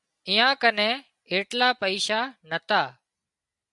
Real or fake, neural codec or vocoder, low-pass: real; none; 10.8 kHz